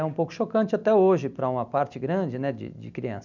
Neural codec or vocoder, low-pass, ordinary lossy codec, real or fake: none; 7.2 kHz; none; real